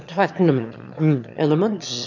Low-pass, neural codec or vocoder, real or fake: 7.2 kHz; autoencoder, 22.05 kHz, a latent of 192 numbers a frame, VITS, trained on one speaker; fake